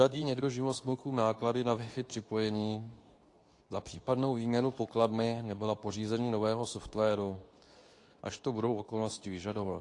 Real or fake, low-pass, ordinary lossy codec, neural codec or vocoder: fake; 10.8 kHz; AAC, 48 kbps; codec, 24 kHz, 0.9 kbps, WavTokenizer, medium speech release version 2